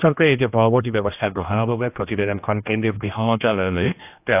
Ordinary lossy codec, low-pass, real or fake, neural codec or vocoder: AAC, 32 kbps; 3.6 kHz; fake; codec, 16 kHz, 1 kbps, X-Codec, HuBERT features, trained on general audio